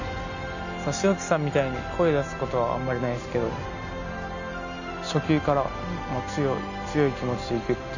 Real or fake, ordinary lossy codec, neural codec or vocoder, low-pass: real; none; none; 7.2 kHz